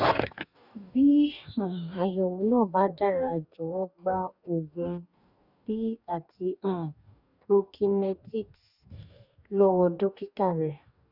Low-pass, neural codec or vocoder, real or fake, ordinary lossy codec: 5.4 kHz; codec, 44.1 kHz, 2.6 kbps, DAC; fake; none